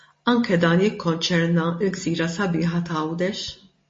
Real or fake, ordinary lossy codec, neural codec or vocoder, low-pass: real; MP3, 32 kbps; none; 9.9 kHz